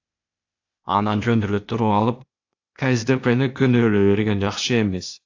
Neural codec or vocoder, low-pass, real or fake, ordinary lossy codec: codec, 16 kHz, 0.8 kbps, ZipCodec; 7.2 kHz; fake; AAC, 48 kbps